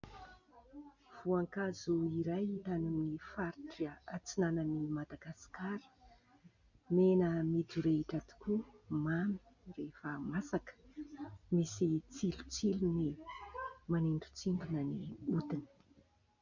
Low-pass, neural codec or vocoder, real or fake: 7.2 kHz; none; real